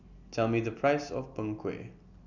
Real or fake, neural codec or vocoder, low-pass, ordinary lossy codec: real; none; 7.2 kHz; none